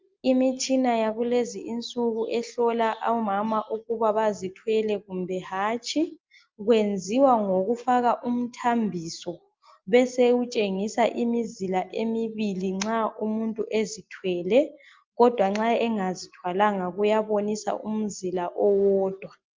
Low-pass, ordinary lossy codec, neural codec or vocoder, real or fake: 7.2 kHz; Opus, 32 kbps; none; real